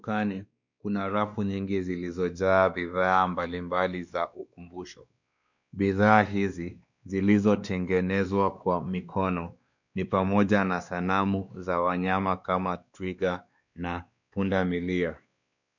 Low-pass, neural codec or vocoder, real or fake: 7.2 kHz; codec, 16 kHz, 2 kbps, X-Codec, WavLM features, trained on Multilingual LibriSpeech; fake